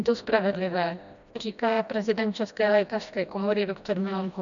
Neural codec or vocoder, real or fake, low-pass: codec, 16 kHz, 1 kbps, FreqCodec, smaller model; fake; 7.2 kHz